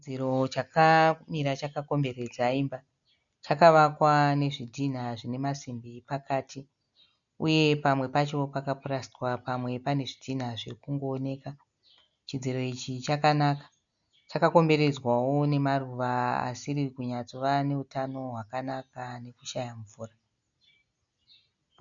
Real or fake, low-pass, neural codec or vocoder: real; 7.2 kHz; none